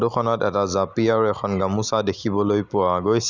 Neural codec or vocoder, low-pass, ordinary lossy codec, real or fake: none; 7.2 kHz; Opus, 64 kbps; real